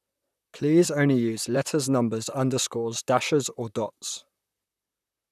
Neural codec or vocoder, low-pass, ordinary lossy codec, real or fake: vocoder, 44.1 kHz, 128 mel bands, Pupu-Vocoder; 14.4 kHz; none; fake